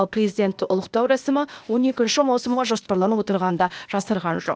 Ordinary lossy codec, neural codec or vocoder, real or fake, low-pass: none; codec, 16 kHz, 0.8 kbps, ZipCodec; fake; none